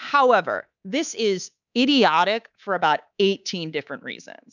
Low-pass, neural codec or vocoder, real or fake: 7.2 kHz; codec, 24 kHz, 3.1 kbps, DualCodec; fake